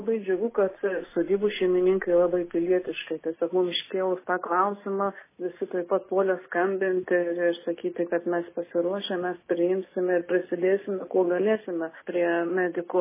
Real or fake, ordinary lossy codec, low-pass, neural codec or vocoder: real; MP3, 16 kbps; 3.6 kHz; none